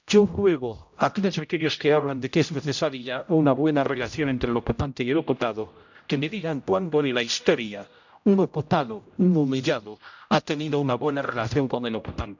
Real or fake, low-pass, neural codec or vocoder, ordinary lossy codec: fake; 7.2 kHz; codec, 16 kHz, 0.5 kbps, X-Codec, HuBERT features, trained on general audio; none